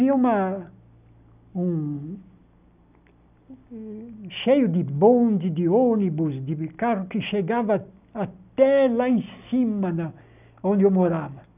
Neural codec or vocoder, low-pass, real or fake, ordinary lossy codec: none; 3.6 kHz; real; none